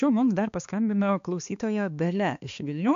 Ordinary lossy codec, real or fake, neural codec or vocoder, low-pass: AAC, 64 kbps; fake; codec, 16 kHz, 2 kbps, X-Codec, HuBERT features, trained on balanced general audio; 7.2 kHz